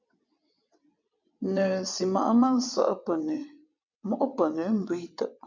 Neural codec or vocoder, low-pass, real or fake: vocoder, 22.05 kHz, 80 mel bands, WaveNeXt; 7.2 kHz; fake